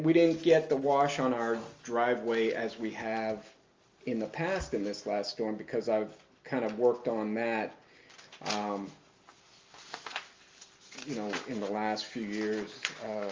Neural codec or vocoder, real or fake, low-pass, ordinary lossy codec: none; real; 7.2 kHz; Opus, 32 kbps